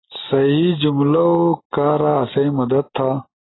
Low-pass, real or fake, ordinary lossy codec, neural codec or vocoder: 7.2 kHz; real; AAC, 16 kbps; none